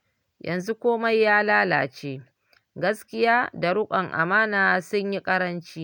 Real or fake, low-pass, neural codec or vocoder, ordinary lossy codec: real; 19.8 kHz; none; none